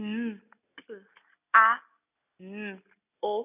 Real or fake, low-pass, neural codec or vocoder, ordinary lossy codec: real; 3.6 kHz; none; none